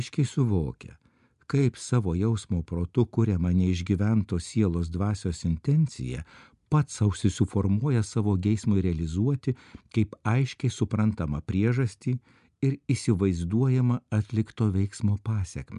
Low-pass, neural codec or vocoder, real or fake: 10.8 kHz; none; real